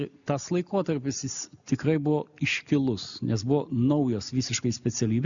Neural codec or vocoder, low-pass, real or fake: none; 7.2 kHz; real